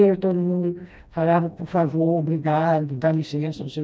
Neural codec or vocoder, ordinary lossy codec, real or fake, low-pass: codec, 16 kHz, 1 kbps, FreqCodec, smaller model; none; fake; none